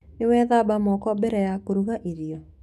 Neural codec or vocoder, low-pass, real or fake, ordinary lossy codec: autoencoder, 48 kHz, 128 numbers a frame, DAC-VAE, trained on Japanese speech; 14.4 kHz; fake; none